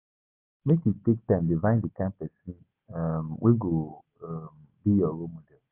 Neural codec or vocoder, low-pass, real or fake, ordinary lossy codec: none; 3.6 kHz; real; Opus, 24 kbps